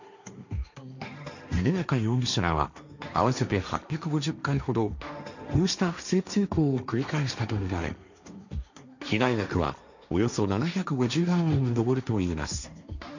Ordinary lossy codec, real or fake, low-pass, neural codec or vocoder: none; fake; 7.2 kHz; codec, 16 kHz, 1.1 kbps, Voila-Tokenizer